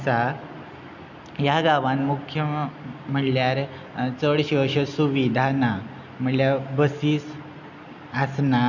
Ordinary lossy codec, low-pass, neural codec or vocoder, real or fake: none; 7.2 kHz; none; real